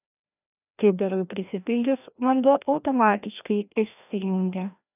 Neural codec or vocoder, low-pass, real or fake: codec, 16 kHz, 1 kbps, FreqCodec, larger model; 3.6 kHz; fake